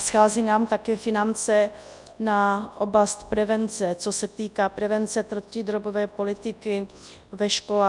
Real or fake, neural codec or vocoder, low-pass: fake; codec, 24 kHz, 0.9 kbps, WavTokenizer, large speech release; 10.8 kHz